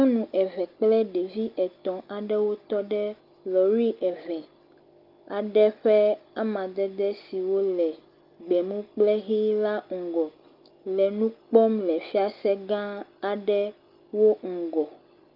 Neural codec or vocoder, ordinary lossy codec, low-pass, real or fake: none; Opus, 32 kbps; 5.4 kHz; real